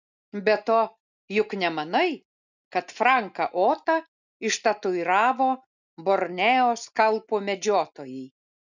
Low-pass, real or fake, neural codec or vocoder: 7.2 kHz; real; none